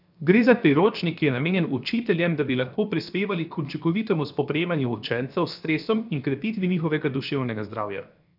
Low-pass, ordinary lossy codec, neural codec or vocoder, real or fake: 5.4 kHz; none; codec, 16 kHz, 0.7 kbps, FocalCodec; fake